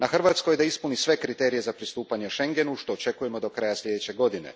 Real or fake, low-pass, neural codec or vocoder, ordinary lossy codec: real; none; none; none